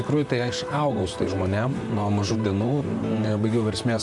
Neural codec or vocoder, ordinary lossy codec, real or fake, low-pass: vocoder, 44.1 kHz, 128 mel bands, Pupu-Vocoder; MP3, 96 kbps; fake; 10.8 kHz